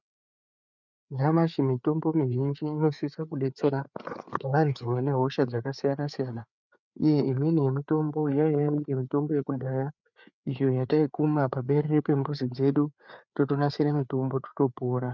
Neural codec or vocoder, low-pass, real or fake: codec, 16 kHz, 4 kbps, FreqCodec, larger model; 7.2 kHz; fake